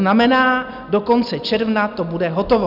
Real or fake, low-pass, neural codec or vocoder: real; 5.4 kHz; none